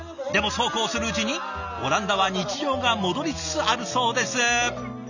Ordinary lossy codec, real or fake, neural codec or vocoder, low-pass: none; real; none; 7.2 kHz